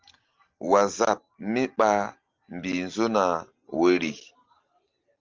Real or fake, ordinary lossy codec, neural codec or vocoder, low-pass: real; Opus, 32 kbps; none; 7.2 kHz